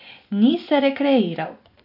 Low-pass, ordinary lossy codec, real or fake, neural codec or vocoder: 5.4 kHz; none; fake; vocoder, 24 kHz, 100 mel bands, Vocos